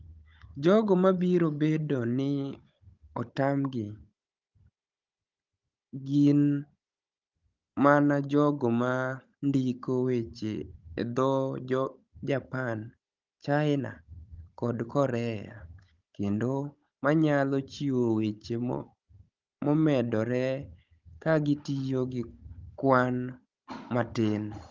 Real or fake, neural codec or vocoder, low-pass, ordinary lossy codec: fake; codec, 16 kHz, 16 kbps, FunCodec, trained on Chinese and English, 50 frames a second; 7.2 kHz; Opus, 32 kbps